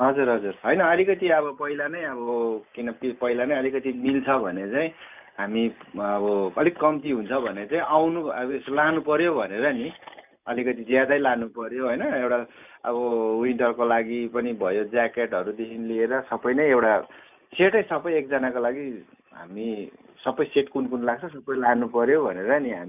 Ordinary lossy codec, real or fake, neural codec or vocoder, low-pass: none; real; none; 3.6 kHz